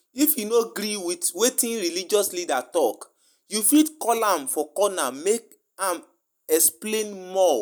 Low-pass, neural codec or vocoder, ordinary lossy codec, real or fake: none; none; none; real